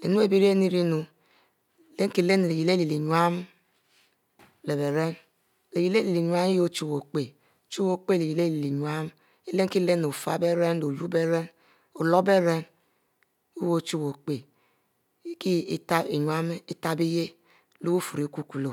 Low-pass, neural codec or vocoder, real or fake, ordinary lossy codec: 19.8 kHz; none; real; none